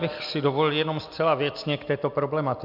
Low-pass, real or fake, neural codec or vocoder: 5.4 kHz; real; none